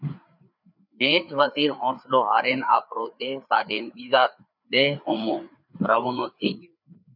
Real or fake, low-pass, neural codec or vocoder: fake; 5.4 kHz; codec, 16 kHz, 4 kbps, FreqCodec, larger model